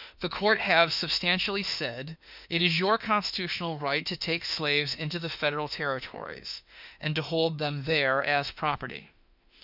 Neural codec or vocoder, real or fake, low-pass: autoencoder, 48 kHz, 32 numbers a frame, DAC-VAE, trained on Japanese speech; fake; 5.4 kHz